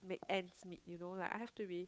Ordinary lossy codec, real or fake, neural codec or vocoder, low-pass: none; fake; codec, 16 kHz, 8 kbps, FunCodec, trained on Chinese and English, 25 frames a second; none